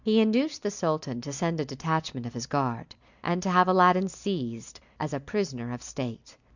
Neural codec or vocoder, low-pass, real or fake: none; 7.2 kHz; real